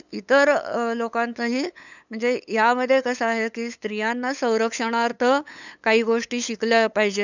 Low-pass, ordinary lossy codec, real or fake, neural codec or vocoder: 7.2 kHz; none; fake; codec, 16 kHz, 8 kbps, FunCodec, trained on LibriTTS, 25 frames a second